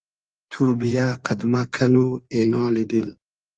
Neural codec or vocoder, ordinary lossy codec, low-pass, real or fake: codec, 16 kHz in and 24 kHz out, 1.1 kbps, FireRedTTS-2 codec; Opus, 32 kbps; 9.9 kHz; fake